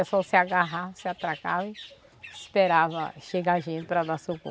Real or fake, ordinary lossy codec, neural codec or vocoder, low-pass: real; none; none; none